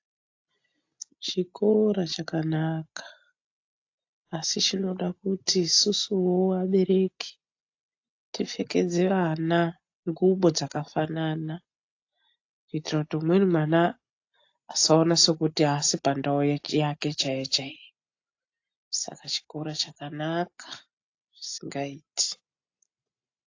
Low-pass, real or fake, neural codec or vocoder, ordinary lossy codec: 7.2 kHz; real; none; AAC, 48 kbps